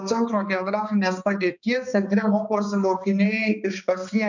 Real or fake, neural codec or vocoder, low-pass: fake; codec, 16 kHz, 2 kbps, X-Codec, HuBERT features, trained on balanced general audio; 7.2 kHz